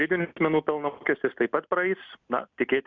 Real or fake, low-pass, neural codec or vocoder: real; 7.2 kHz; none